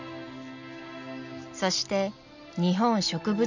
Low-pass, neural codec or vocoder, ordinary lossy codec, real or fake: 7.2 kHz; none; none; real